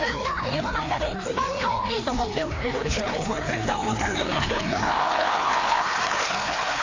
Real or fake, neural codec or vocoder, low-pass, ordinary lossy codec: fake; codec, 16 kHz, 2 kbps, FreqCodec, larger model; 7.2 kHz; AAC, 32 kbps